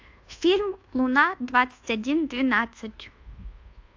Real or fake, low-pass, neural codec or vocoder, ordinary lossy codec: fake; 7.2 kHz; codec, 24 kHz, 1.2 kbps, DualCodec; AAC, 48 kbps